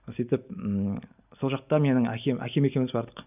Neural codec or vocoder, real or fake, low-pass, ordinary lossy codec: none; real; 3.6 kHz; none